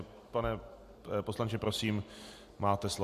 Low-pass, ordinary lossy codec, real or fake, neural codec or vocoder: 14.4 kHz; MP3, 64 kbps; real; none